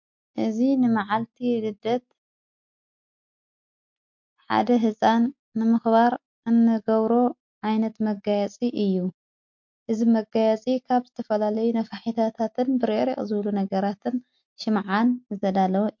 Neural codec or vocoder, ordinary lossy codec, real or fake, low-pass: none; MP3, 48 kbps; real; 7.2 kHz